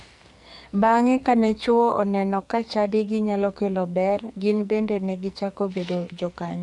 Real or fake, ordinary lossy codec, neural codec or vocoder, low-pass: fake; none; codec, 44.1 kHz, 2.6 kbps, SNAC; 10.8 kHz